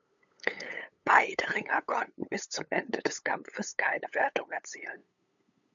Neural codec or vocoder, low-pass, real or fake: codec, 16 kHz, 8 kbps, FunCodec, trained on LibriTTS, 25 frames a second; 7.2 kHz; fake